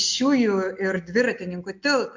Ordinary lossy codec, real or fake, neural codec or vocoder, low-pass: MP3, 48 kbps; real; none; 7.2 kHz